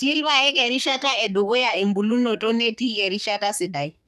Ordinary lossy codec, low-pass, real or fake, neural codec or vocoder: none; 14.4 kHz; fake; codec, 32 kHz, 1.9 kbps, SNAC